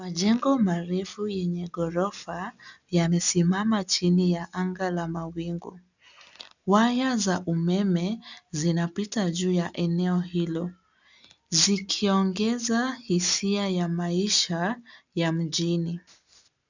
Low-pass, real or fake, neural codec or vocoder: 7.2 kHz; real; none